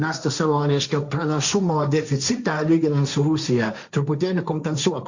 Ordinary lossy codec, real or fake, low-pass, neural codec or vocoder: Opus, 64 kbps; fake; 7.2 kHz; codec, 16 kHz, 1.1 kbps, Voila-Tokenizer